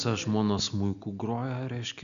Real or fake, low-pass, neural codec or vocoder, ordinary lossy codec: real; 7.2 kHz; none; MP3, 96 kbps